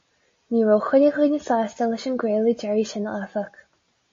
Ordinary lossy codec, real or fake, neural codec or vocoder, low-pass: MP3, 32 kbps; real; none; 7.2 kHz